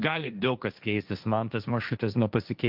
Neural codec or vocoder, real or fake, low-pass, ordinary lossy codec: codec, 16 kHz, 1.1 kbps, Voila-Tokenizer; fake; 5.4 kHz; Opus, 32 kbps